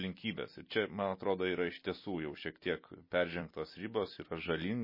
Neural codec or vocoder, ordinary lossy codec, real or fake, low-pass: vocoder, 44.1 kHz, 128 mel bands every 256 samples, BigVGAN v2; MP3, 24 kbps; fake; 5.4 kHz